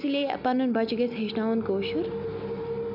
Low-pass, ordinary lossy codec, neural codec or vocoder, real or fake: 5.4 kHz; none; none; real